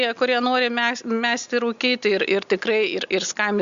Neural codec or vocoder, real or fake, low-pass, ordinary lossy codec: none; real; 7.2 kHz; Opus, 64 kbps